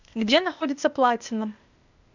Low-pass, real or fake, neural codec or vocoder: 7.2 kHz; fake; codec, 16 kHz, 0.8 kbps, ZipCodec